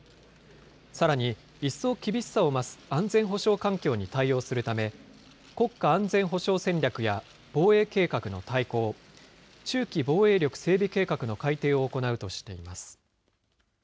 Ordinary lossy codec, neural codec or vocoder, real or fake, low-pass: none; none; real; none